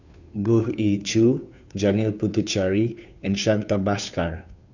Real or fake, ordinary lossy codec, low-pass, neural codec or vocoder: fake; none; 7.2 kHz; codec, 16 kHz, 2 kbps, FunCodec, trained on Chinese and English, 25 frames a second